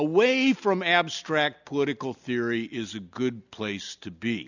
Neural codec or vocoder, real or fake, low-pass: none; real; 7.2 kHz